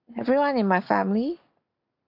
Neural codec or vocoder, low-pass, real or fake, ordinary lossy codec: none; 5.4 kHz; real; MP3, 48 kbps